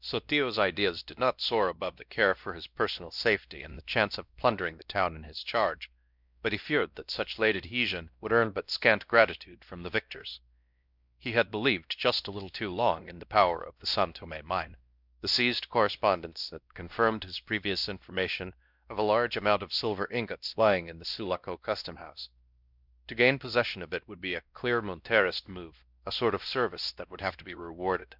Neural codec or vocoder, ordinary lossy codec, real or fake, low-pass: codec, 16 kHz, 1 kbps, X-Codec, WavLM features, trained on Multilingual LibriSpeech; Opus, 64 kbps; fake; 5.4 kHz